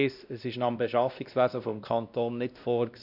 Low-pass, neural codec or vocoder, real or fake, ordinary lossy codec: 5.4 kHz; codec, 16 kHz, 1 kbps, X-Codec, WavLM features, trained on Multilingual LibriSpeech; fake; none